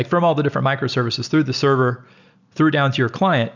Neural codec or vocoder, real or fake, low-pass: none; real; 7.2 kHz